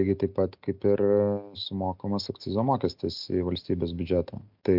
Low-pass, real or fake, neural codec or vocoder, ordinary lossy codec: 5.4 kHz; real; none; MP3, 48 kbps